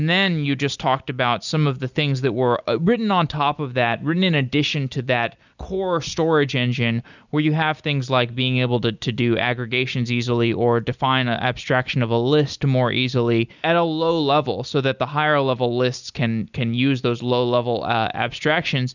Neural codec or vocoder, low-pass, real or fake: none; 7.2 kHz; real